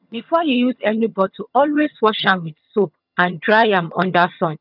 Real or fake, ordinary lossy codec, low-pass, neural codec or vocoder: fake; none; 5.4 kHz; vocoder, 22.05 kHz, 80 mel bands, HiFi-GAN